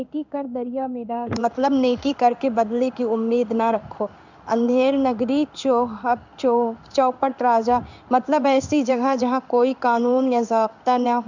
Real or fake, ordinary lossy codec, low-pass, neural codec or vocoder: fake; none; 7.2 kHz; codec, 16 kHz in and 24 kHz out, 1 kbps, XY-Tokenizer